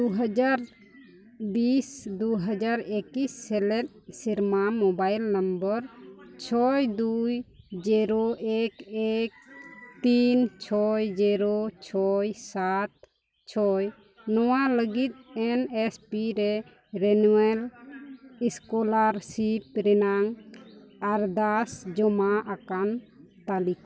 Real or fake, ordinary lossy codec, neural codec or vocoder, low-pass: real; none; none; none